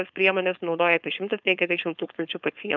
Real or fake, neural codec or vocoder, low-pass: fake; codec, 16 kHz, 4.8 kbps, FACodec; 7.2 kHz